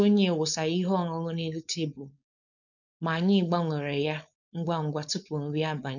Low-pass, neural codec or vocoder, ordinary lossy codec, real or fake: 7.2 kHz; codec, 16 kHz, 4.8 kbps, FACodec; none; fake